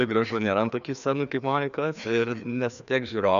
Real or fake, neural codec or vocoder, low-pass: fake; codec, 16 kHz, 2 kbps, FreqCodec, larger model; 7.2 kHz